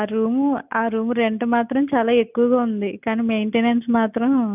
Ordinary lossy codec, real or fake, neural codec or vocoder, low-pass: none; real; none; 3.6 kHz